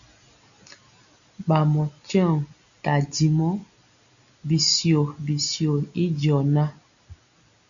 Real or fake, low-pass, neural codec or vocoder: real; 7.2 kHz; none